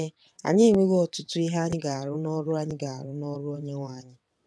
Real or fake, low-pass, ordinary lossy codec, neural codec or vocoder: fake; none; none; vocoder, 22.05 kHz, 80 mel bands, WaveNeXt